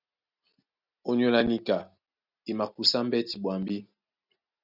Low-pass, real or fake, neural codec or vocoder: 5.4 kHz; real; none